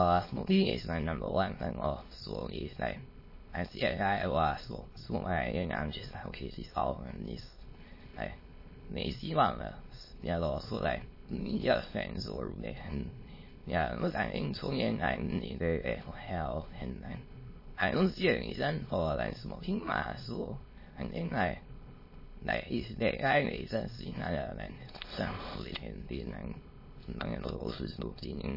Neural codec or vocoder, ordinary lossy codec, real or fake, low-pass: autoencoder, 22.05 kHz, a latent of 192 numbers a frame, VITS, trained on many speakers; MP3, 24 kbps; fake; 5.4 kHz